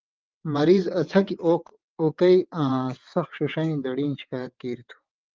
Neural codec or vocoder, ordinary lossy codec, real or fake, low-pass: vocoder, 22.05 kHz, 80 mel bands, WaveNeXt; Opus, 32 kbps; fake; 7.2 kHz